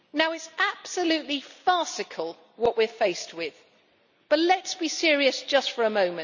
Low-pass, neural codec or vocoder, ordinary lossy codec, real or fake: 7.2 kHz; none; none; real